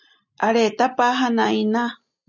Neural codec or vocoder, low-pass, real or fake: none; 7.2 kHz; real